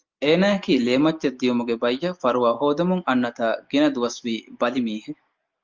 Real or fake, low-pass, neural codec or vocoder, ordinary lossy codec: real; 7.2 kHz; none; Opus, 24 kbps